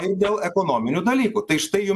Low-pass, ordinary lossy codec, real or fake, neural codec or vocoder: 14.4 kHz; MP3, 96 kbps; real; none